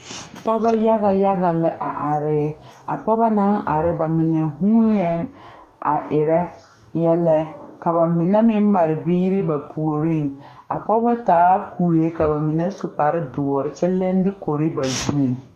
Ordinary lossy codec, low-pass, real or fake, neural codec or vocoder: MP3, 96 kbps; 14.4 kHz; fake; codec, 44.1 kHz, 2.6 kbps, DAC